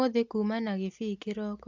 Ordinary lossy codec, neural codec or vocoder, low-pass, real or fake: AAC, 48 kbps; none; 7.2 kHz; real